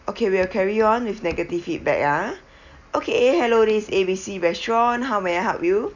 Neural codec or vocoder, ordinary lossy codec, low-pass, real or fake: none; none; 7.2 kHz; real